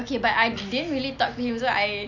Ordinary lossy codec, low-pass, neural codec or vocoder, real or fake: none; 7.2 kHz; none; real